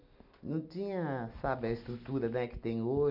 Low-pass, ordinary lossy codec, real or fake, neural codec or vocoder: 5.4 kHz; none; real; none